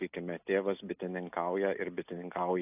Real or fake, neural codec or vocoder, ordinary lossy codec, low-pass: real; none; AAC, 32 kbps; 3.6 kHz